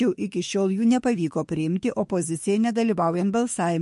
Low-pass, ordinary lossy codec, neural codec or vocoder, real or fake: 14.4 kHz; MP3, 48 kbps; autoencoder, 48 kHz, 32 numbers a frame, DAC-VAE, trained on Japanese speech; fake